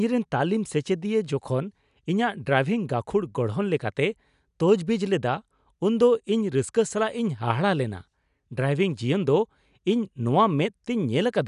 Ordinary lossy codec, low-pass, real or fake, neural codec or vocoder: none; 10.8 kHz; real; none